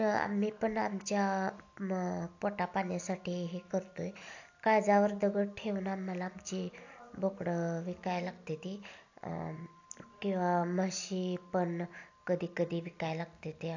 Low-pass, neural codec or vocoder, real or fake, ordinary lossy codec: 7.2 kHz; none; real; AAC, 48 kbps